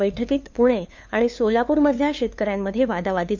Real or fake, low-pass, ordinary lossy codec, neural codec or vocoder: fake; 7.2 kHz; MP3, 64 kbps; codec, 16 kHz, 2 kbps, FunCodec, trained on LibriTTS, 25 frames a second